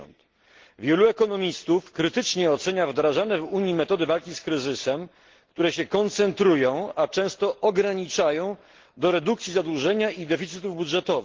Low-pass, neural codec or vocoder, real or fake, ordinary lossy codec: 7.2 kHz; none; real; Opus, 16 kbps